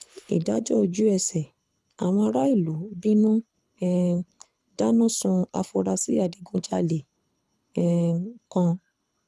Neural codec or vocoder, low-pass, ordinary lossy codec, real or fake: codec, 24 kHz, 6 kbps, HILCodec; none; none; fake